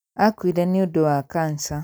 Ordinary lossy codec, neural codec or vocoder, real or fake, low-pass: none; none; real; none